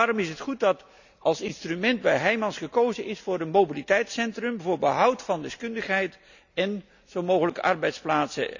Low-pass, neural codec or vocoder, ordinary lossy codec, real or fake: 7.2 kHz; none; none; real